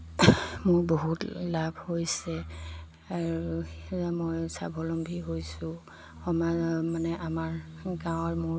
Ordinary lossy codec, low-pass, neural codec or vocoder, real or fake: none; none; none; real